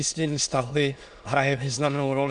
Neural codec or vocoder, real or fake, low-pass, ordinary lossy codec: autoencoder, 22.05 kHz, a latent of 192 numbers a frame, VITS, trained on many speakers; fake; 9.9 kHz; MP3, 96 kbps